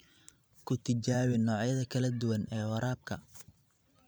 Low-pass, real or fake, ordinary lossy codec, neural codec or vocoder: none; fake; none; vocoder, 44.1 kHz, 128 mel bands every 512 samples, BigVGAN v2